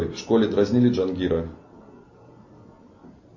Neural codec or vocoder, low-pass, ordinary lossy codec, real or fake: none; 7.2 kHz; MP3, 32 kbps; real